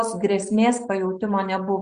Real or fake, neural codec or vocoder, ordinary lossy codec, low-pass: real; none; Opus, 64 kbps; 9.9 kHz